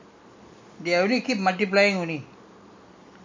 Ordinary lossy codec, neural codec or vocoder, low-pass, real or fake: MP3, 48 kbps; none; 7.2 kHz; real